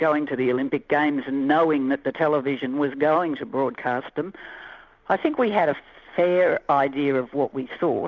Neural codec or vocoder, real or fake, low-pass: none; real; 7.2 kHz